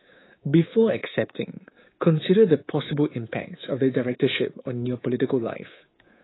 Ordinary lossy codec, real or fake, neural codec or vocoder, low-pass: AAC, 16 kbps; fake; codec, 24 kHz, 3.1 kbps, DualCodec; 7.2 kHz